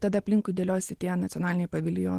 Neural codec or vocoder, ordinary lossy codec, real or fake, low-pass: none; Opus, 16 kbps; real; 14.4 kHz